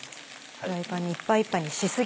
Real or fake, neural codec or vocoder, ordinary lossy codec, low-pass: real; none; none; none